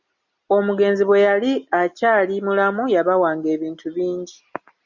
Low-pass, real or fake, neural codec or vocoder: 7.2 kHz; real; none